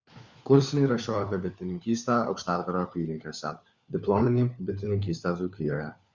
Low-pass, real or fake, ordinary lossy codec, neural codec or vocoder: 7.2 kHz; fake; Opus, 64 kbps; codec, 16 kHz, 4 kbps, FunCodec, trained on LibriTTS, 50 frames a second